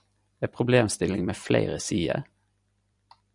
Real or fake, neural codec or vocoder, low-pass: fake; vocoder, 44.1 kHz, 128 mel bands every 256 samples, BigVGAN v2; 10.8 kHz